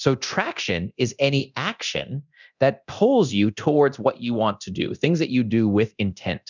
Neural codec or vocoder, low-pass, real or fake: codec, 24 kHz, 0.9 kbps, DualCodec; 7.2 kHz; fake